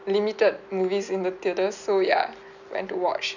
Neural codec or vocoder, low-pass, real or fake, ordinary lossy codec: none; 7.2 kHz; real; none